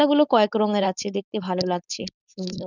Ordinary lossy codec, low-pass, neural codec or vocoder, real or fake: none; 7.2 kHz; codec, 16 kHz, 4.8 kbps, FACodec; fake